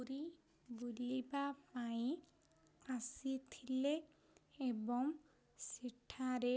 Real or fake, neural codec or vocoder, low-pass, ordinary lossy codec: real; none; none; none